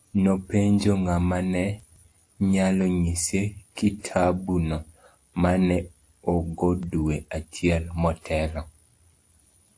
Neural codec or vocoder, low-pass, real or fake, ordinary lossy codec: none; 9.9 kHz; real; AAC, 48 kbps